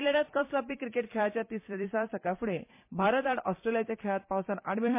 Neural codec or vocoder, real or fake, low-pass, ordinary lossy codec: vocoder, 44.1 kHz, 128 mel bands every 256 samples, BigVGAN v2; fake; 3.6 kHz; MP3, 24 kbps